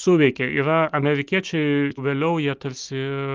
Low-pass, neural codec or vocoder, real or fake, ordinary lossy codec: 7.2 kHz; none; real; Opus, 32 kbps